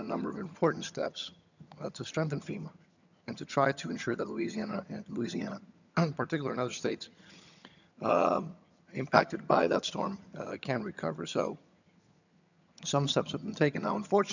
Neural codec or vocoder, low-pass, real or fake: vocoder, 22.05 kHz, 80 mel bands, HiFi-GAN; 7.2 kHz; fake